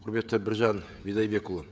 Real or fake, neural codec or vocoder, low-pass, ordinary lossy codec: fake; codec, 16 kHz, 16 kbps, FreqCodec, smaller model; none; none